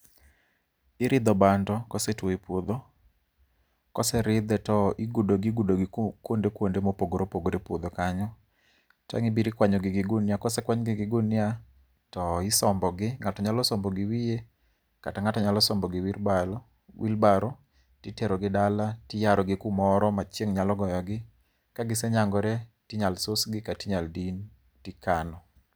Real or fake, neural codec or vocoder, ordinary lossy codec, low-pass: real; none; none; none